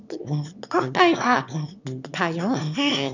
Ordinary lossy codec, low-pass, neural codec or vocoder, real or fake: none; 7.2 kHz; autoencoder, 22.05 kHz, a latent of 192 numbers a frame, VITS, trained on one speaker; fake